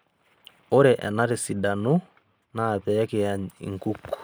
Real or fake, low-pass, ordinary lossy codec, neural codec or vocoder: real; none; none; none